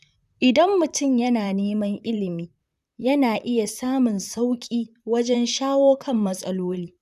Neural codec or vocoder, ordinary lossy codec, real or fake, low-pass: vocoder, 44.1 kHz, 128 mel bands, Pupu-Vocoder; none; fake; 14.4 kHz